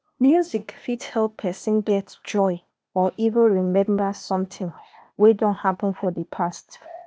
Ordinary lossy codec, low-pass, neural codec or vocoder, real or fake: none; none; codec, 16 kHz, 0.8 kbps, ZipCodec; fake